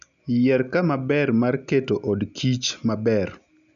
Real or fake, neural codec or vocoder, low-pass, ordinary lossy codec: real; none; 7.2 kHz; none